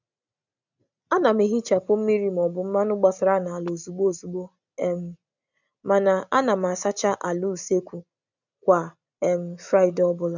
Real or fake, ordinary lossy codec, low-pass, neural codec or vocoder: real; none; 7.2 kHz; none